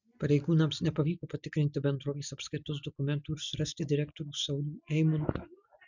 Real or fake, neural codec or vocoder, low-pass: real; none; 7.2 kHz